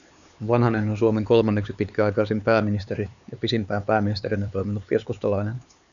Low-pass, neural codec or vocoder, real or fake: 7.2 kHz; codec, 16 kHz, 4 kbps, X-Codec, HuBERT features, trained on LibriSpeech; fake